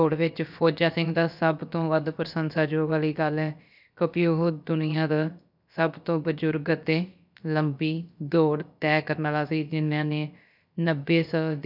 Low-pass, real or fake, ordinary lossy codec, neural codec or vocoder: 5.4 kHz; fake; none; codec, 16 kHz, about 1 kbps, DyCAST, with the encoder's durations